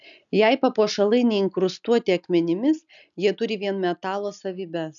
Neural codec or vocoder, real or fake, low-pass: none; real; 7.2 kHz